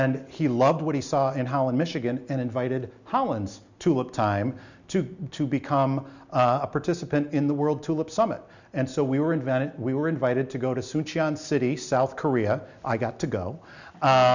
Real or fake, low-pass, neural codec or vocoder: real; 7.2 kHz; none